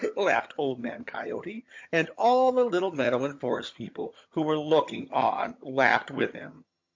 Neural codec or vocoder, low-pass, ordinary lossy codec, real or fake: vocoder, 22.05 kHz, 80 mel bands, HiFi-GAN; 7.2 kHz; MP3, 48 kbps; fake